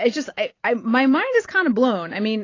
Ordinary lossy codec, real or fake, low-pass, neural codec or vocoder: AAC, 32 kbps; fake; 7.2 kHz; vocoder, 44.1 kHz, 128 mel bands every 512 samples, BigVGAN v2